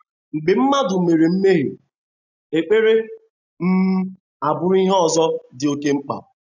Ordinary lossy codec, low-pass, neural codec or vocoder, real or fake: none; 7.2 kHz; none; real